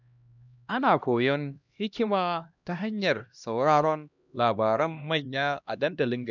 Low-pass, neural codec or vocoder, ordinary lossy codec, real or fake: 7.2 kHz; codec, 16 kHz, 1 kbps, X-Codec, HuBERT features, trained on LibriSpeech; none; fake